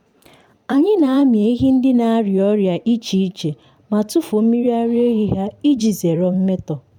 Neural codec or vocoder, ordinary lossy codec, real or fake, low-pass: vocoder, 44.1 kHz, 128 mel bands every 512 samples, BigVGAN v2; none; fake; 19.8 kHz